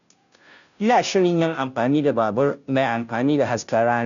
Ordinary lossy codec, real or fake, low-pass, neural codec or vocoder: none; fake; 7.2 kHz; codec, 16 kHz, 0.5 kbps, FunCodec, trained on Chinese and English, 25 frames a second